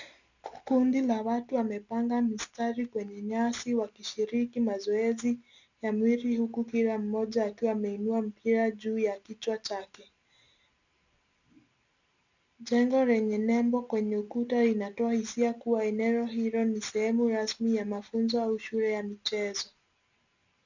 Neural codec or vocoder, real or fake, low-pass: none; real; 7.2 kHz